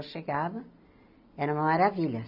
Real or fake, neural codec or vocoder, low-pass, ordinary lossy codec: real; none; 5.4 kHz; none